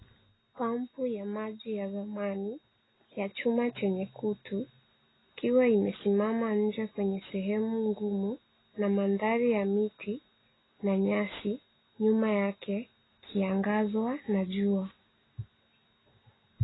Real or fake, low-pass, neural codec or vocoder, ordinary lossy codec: real; 7.2 kHz; none; AAC, 16 kbps